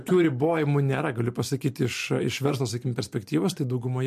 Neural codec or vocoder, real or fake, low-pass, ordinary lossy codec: none; real; 14.4 kHz; MP3, 64 kbps